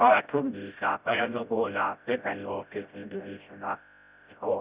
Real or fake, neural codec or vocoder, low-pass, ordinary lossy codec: fake; codec, 16 kHz, 0.5 kbps, FreqCodec, smaller model; 3.6 kHz; Opus, 64 kbps